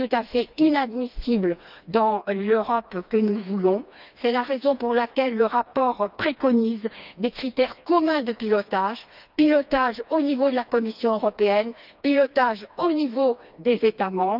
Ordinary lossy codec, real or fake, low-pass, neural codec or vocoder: none; fake; 5.4 kHz; codec, 16 kHz, 2 kbps, FreqCodec, smaller model